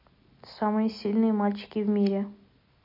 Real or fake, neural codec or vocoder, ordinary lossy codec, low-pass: real; none; MP3, 32 kbps; 5.4 kHz